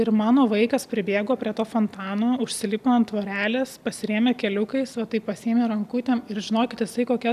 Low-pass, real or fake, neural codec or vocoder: 14.4 kHz; real; none